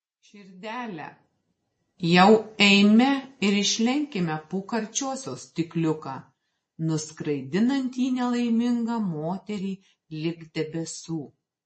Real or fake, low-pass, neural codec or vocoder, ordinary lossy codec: real; 10.8 kHz; none; MP3, 32 kbps